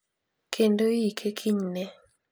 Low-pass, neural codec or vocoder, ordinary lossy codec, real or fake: none; none; none; real